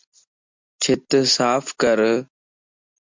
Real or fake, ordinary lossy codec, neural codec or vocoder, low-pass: real; MP3, 64 kbps; none; 7.2 kHz